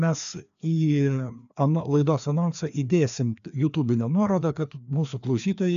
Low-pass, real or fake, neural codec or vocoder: 7.2 kHz; fake; codec, 16 kHz, 2 kbps, FreqCodec, larger model